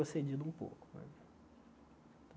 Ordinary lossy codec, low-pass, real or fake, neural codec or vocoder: none; none; real; none